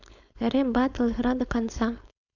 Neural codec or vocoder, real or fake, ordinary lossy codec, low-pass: codec, 16 kHz, 4.8 kbps, FACodec; fake; none; 7.2 kHz